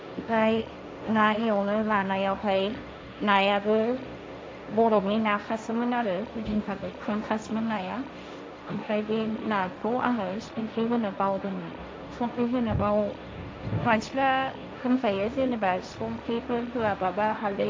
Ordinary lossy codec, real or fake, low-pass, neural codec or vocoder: none; fake; none; codec, 16 kHz, 1.1 kbps, Voila-Tokenizer